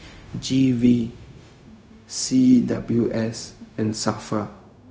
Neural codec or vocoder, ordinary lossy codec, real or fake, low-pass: codec, 16 kHz, 0.4 kbps, LongCat-Audio-Codec; none; fake; none